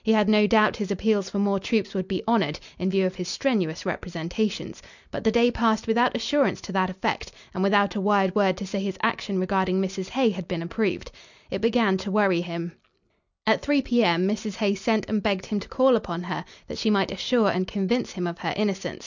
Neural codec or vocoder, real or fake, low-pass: none; real; 7.2 kHz